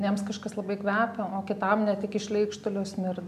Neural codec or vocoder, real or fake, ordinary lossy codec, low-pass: none; real; AAC, 96 kbps; 14.4 kHz